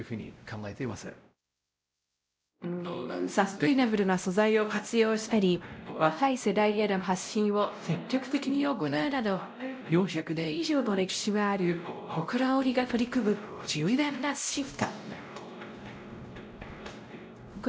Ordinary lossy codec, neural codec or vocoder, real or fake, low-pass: none; codec, 16 kHz, 0.5 kbps, X-Codec, WavLM features, trained on Multilingual LibriSpeech; fake; none